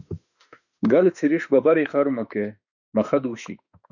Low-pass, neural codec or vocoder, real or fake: 7.2 kHz; autoencoder, 48 kHz, 32 numbers a frame, DAC-VAE, trained on Japanese speech; fake